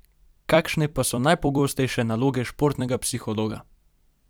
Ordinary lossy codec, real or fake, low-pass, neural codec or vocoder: none; fake; none; vocoder, 44.1 kHz, 128 mel bands every 256 samples, BigVGAN v2